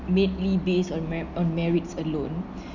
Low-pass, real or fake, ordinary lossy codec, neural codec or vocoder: 7.2 kHz; real; none; none